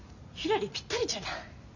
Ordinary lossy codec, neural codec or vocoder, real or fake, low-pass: none; none; real; 7.2 kHz